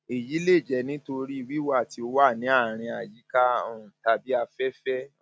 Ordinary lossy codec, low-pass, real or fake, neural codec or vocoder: none; none; real; none